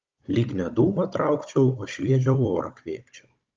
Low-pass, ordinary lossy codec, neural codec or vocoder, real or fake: 7.2 kHz; Opus, 24 kbps; codec, 16 kHz, 16 kbps, FunCodec, trained on Chinese and English, 50 frames a second; fake